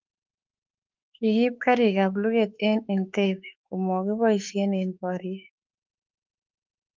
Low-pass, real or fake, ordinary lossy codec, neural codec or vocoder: 7.2 kHz; fake; Opus, 24 kbps; autoencoder, 48 kHz, 32 numbers a frame, DAC-VAE, trained on Japanese speech